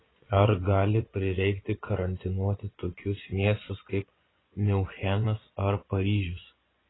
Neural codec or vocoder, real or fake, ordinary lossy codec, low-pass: vocoder, 24 kHz, 100 mel bands, Vocos; fake; AAC, 16 kbps; 7.2 kHz